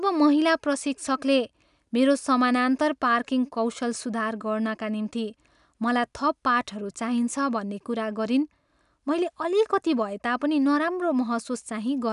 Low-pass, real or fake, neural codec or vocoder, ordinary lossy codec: 10.8 kHz; real; none; none